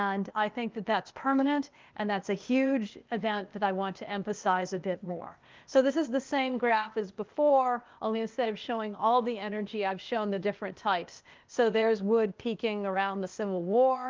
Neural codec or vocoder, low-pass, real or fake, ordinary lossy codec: codec, 16 kHz, 0.8 kbps, ZipCodec; 7.2 kHz; fake; Opus, 24 kbps